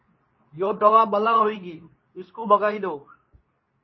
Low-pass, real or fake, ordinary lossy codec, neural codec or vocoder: 7.2 kHz; fake; MP3, 24 kbps; codec, 24 kHz, 0.9 kbps, WavTokenizer, medium speech release version 2